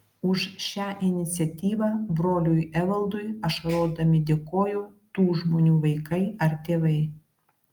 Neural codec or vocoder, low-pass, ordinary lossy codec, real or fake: none; 19.8 kHz; Opus, 32 kbps; real